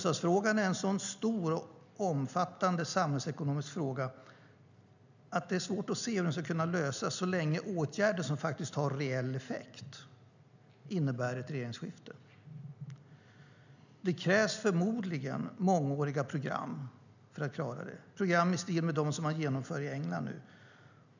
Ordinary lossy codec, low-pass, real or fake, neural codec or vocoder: none; 7.2 kHz; real; none